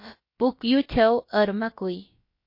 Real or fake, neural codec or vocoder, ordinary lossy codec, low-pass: fake; codec, 16 kHz, about 1 kbps, DyCAST, with the encoder's durations; MP3, 32 kbps; 5.4 kHz